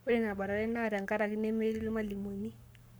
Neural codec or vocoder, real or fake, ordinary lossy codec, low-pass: codec, 44.1 kHz, 7.8 kbps, Pupu-Codec; fake; none; none